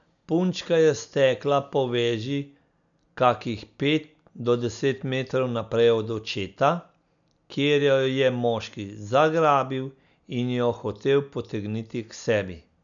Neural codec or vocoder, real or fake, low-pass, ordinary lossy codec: none; real; 7.2 kHz; none